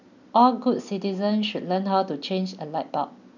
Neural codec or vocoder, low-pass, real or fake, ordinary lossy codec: none; 7.2 kHz; real; none